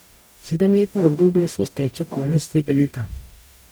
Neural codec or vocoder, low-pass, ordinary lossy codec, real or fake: codec, 44.1 kHz, 0.9 kbps, DAC; none; none; fake